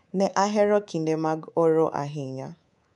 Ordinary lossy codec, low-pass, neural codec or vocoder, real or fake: none; 10.8 kHz; codec, 24 kHz, 3.1 kbps, DualCodec; fake